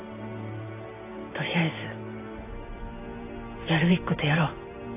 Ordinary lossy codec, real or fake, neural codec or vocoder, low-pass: AAC, 24 kbps; fake; vocoder, 44.1 kHz, 128 mel bands every 512 samples, BigVGAN v2; 3.6 kHz